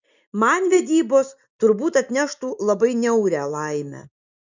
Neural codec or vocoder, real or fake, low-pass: none; real; 7.2 kHz